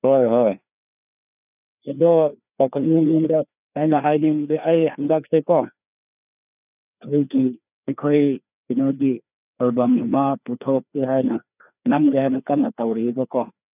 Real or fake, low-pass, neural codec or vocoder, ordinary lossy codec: fake; 3.6 kHz; codec, 16 kHz, 2 kbps, FreqCodec, larger model; none